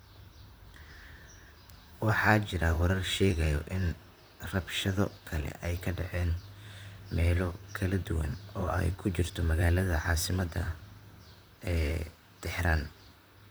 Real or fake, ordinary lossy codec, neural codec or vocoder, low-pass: fake; none; vocoder, 44.1 kHz, 128 mel bands, Pupu-Vocoder; none